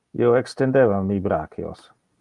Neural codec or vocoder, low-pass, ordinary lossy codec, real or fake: none; 10.8 kHz; Opus, 32 kbps; real